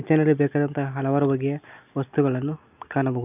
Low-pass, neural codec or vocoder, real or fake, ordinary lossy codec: 3.6 kHz; none; real; none